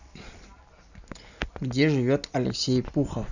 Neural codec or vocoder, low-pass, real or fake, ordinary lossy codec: none; 7.2 kHz; real; none